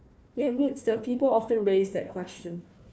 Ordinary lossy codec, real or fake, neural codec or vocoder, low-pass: none; fake; codec, 16 kHz, 1 kbps, FunCodec, trained on Chinese and English, 50 frames a second; none